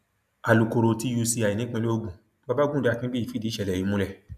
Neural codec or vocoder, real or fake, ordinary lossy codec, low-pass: none; real; none; 14.4 kHz